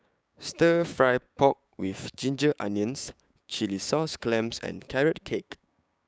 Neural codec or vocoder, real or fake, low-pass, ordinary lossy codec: codec, 16 kHz, 6 kbps, DAC; fake; none; none